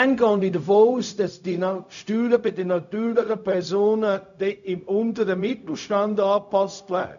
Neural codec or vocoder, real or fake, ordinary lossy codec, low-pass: codec, 16 kHz, 0.4 kbps, LongCat-Audio-Codec; fake; none; 7.2 kHz